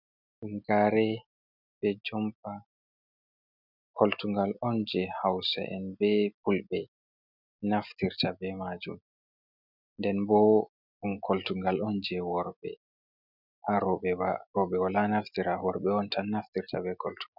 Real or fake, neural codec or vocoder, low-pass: real; none; 5.4 kHz